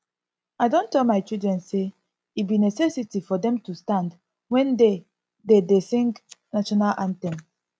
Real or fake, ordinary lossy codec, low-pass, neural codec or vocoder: real; none; none; none